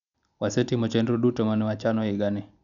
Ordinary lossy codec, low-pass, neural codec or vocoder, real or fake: none; 7.2 kHz; none; real